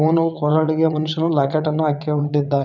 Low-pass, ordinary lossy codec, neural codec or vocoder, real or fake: 7.2 kHz; none; vocoder, 22.05 kHz, 80 mel bands, WaveNeXt; fake